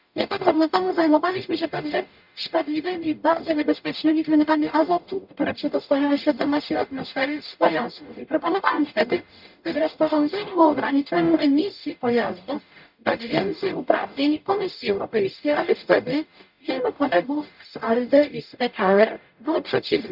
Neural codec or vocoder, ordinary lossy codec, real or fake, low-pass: codec, 44.1 kHz, 0.9 kbps, DAC; none; fake; 5.4 kHz